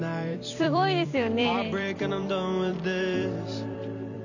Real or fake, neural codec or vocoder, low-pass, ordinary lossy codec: fake; vocoder, 44.1 kHz, 128 mel bands every 256 samples, BigVGAN v2; 7.2 kHz; none